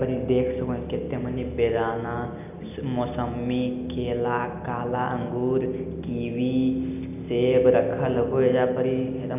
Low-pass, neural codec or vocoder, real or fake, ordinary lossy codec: 3.6 kHz; none; real; none